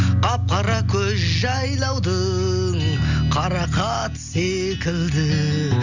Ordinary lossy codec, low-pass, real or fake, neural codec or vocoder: none; 7.2 kHz; real; none